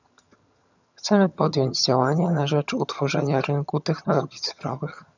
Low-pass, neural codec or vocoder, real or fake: 7.2 kHz; vocoder, 22.05 kHz, 80 mel bands, HiFi-GAN; fake